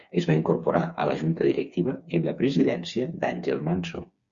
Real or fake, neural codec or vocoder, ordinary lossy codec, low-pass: fake; codec, 16 kHz, 4 kbps, FreqCodec, smaller model; Opus, 64 kbps; 7.2 kHz